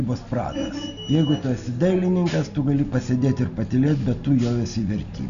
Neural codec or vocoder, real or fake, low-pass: none; real; 7.2 kHz